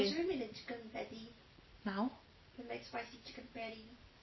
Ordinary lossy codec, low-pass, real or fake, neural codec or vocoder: MP3, 24 kbps; 7.2 kHz; real; none